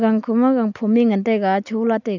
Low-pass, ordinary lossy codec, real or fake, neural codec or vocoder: 7.2 kHz; none; real; none